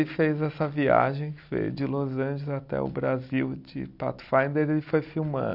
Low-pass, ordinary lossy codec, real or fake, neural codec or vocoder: 5.4 kHz; none; real; none